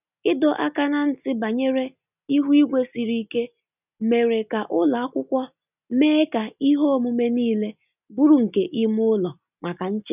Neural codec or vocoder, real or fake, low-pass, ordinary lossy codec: none; real; 3.6 kHz; none